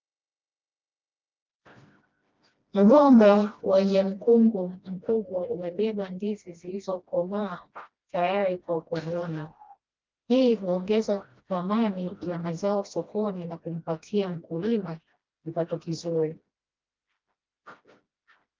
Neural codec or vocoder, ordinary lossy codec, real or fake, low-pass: codec, 16 kHz, 1 kbps, FreqCodec, smaller model; Opus, 24 kbps; fake; 7.2 kHz